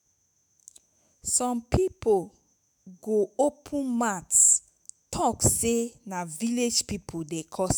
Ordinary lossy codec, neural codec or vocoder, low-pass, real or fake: none; autoencoder, 48 kHz, 128 numbers a frame, DAC-VAE, trained on Japanese speech; none; fake